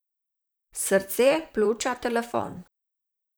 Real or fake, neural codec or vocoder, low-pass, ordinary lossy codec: fake; vocoder, 44.1 kHz, 128 mel bands, Pupu-Vocoder; none; none